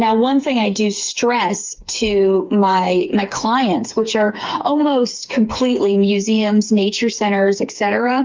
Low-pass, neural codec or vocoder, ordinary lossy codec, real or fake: 7.2 kHz; codec, 16 kHz, 4 kbps, FreqCodec, smaller model; Opus, 24 kbps; fake